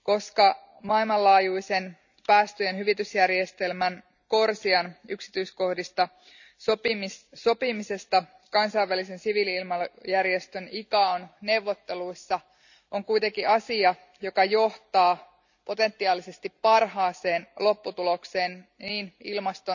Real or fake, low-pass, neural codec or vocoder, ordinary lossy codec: real; 7.2 kHz; none; none